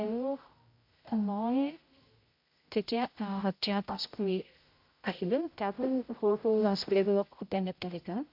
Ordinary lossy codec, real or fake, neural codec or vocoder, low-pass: MP3, 48 kbps; fake; codec, 16 kHz, 0.5 kbps, X-Codec, HuBERT features, trained on general audio; 5.4 kHz